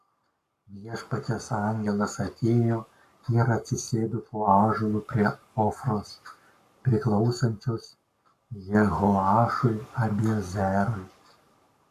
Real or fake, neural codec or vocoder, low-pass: fake; codec, 44.1 kHz, 7.8 kbps, Pupu-Codec; 14.4 kHz